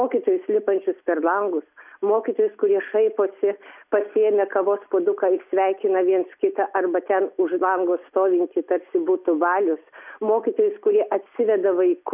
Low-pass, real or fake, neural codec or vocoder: 3.6 kHz; real; none